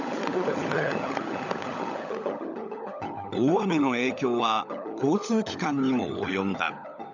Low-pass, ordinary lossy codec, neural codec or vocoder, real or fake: 7.2 kHz; none; codec, 16 kHz, 16 kbps, FunCodec, trained on LibriTTS, 50 frames a second; fake